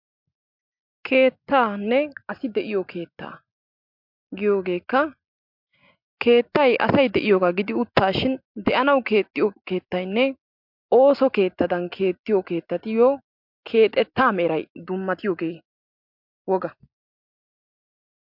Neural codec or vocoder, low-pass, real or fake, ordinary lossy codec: none; 5.4 kHz; real; MP3, 48 kbps